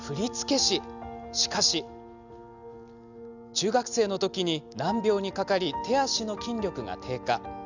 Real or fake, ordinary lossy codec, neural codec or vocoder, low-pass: real; none; none; 7.2 kHz